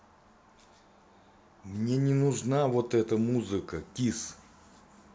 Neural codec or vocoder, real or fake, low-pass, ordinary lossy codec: none; real; none; none